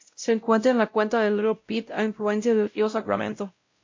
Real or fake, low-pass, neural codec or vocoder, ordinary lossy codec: fake; 7.2 kHz; codec, 16 kHz, 0.5 kbps, X-Codec, WavLM features, trained on Multilingual LibriSpeech; MP3, 48 kbps